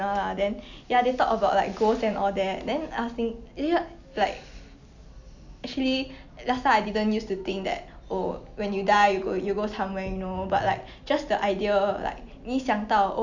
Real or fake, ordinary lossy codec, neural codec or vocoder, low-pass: real; none; none; 7.2 kHz